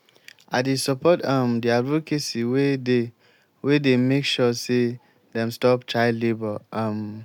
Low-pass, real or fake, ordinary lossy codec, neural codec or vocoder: 19.8 kHz; real; none; none